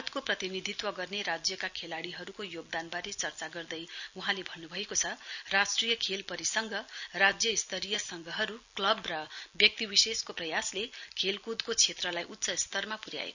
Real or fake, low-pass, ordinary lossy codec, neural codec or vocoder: real; 7.2 kHz; none; none